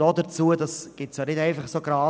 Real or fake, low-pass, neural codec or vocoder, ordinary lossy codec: real; none; none; none